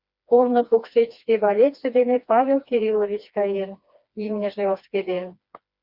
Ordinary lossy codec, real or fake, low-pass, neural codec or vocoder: Opus, 64 kbps; fake; 5.4 kHz; codec, 16 kHz, 2 kbps, FreqCodec, smaller model